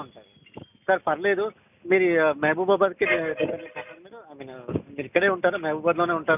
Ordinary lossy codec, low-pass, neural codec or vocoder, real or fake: none; 3.6 kHz; none; real